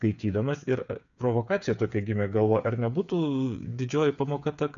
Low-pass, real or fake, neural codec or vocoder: 7.2 kHz; fake; codec, 16 kHz, 8 kbps, FreqCodec, smaller model